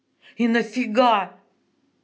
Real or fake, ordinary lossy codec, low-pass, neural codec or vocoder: real; none; none; none